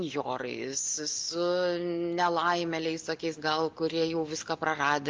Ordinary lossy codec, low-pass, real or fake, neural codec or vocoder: Opus, 32 kbps; 7.2 kHz; real; none